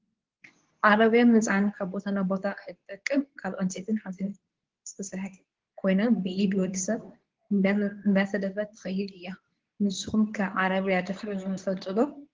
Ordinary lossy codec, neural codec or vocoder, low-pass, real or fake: Opus, 16 kbps; codec, 24 kHz, 0.9 kbps, WavTokenizer, medium speech release version 2; 7.2 kHz; fake